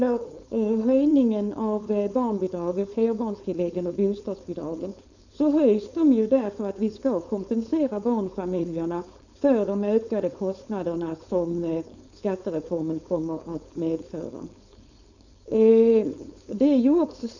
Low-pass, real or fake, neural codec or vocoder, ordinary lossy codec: 7.2 kHz; fake; codec, 16 kHz, 4.8 kbps, FACodec; none